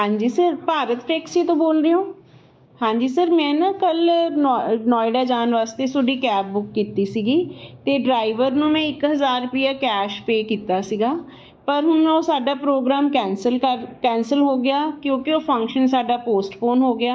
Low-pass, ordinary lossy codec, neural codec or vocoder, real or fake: none; none; codec, 16 kHz, 6 kbps, DAC; fake